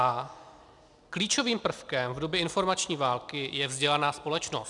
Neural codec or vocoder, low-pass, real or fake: none; 10.8 kHz; real